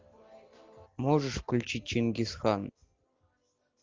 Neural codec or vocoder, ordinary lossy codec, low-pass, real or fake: none; Opus, 24 kbps; 7.2 kHz; real